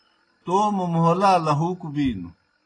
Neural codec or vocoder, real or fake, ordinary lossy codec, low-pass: none; real; AAC, 32 kbps; 9.9 kHz